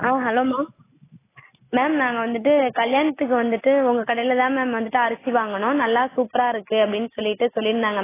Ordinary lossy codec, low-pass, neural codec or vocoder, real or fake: AAC, 16 kbps; 3.6 kHz; none; real